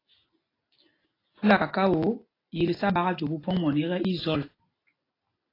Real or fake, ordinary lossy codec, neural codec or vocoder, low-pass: real; AAC, 24 kbps; none; 5.4 kHz